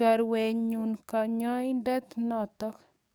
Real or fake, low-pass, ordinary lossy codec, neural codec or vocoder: fake; none; none; codec, 44.1 kHz, 7.8 kbps, DAC